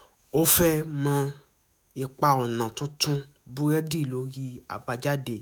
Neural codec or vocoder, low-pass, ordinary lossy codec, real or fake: autoencoder, 48 kHz, 128 numbers a frame, DAC-VAE, trained on Japanese speech; none; none; fake